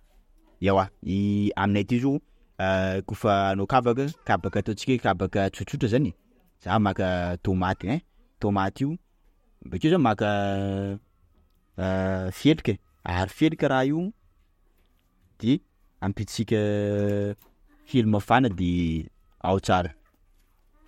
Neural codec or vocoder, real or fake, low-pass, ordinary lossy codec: none; real; 19.8 kHz; MP3, 64 kbps